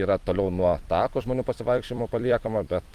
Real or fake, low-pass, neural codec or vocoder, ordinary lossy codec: fake; 14.4 kHz; vocoder, 44.1 kHz, 128 mel bands every 256 samples, BigVGAN v2; Opus, 32 kbps